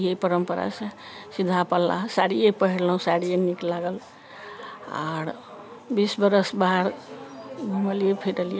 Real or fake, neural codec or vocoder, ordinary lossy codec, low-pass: real; none; none; none